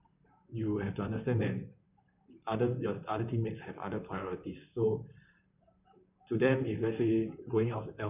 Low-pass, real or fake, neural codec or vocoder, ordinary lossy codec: 3.6 kHz; fake; vocoder, 44.1 kHz, 128 mel bands, Pupu-Vocoder; none